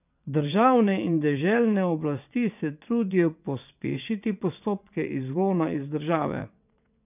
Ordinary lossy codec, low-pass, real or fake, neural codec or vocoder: none; 3.6 kHz; fake; vocoder, 22.05 kHz, 80 mel bands, WaveNeXt